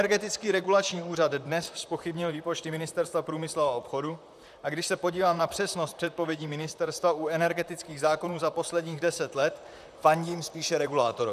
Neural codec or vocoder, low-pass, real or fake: vocoder, 44.1 kHz, 128 mel bands, Pupu-Vocoder; 14.4 kHz; fake